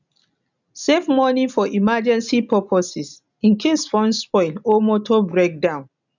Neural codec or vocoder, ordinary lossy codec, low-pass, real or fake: none; none; 7.2 kHz; real